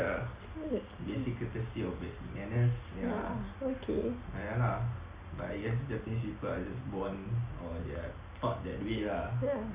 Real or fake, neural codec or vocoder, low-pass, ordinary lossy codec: real; none; 3.6 kHz; Opus, 64 kbps